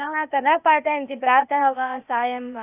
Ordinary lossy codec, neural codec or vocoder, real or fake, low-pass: none; codec, 16 kHz, 0.8 kbps, ZipCodec; fake; 3.6 kHz